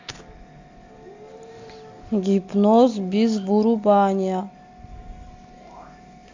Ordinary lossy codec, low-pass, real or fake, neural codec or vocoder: AAC, 48 kbps; 7.2 kHz; real; none